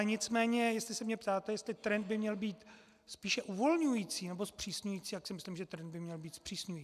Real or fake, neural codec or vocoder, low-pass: real; none; 14.4 kHz